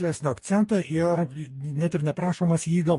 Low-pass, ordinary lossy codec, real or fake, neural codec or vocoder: 14.4 kHz; MP3, 48 kbps; fake; codec, 44.1 kHz, 2.6 kbps, DAC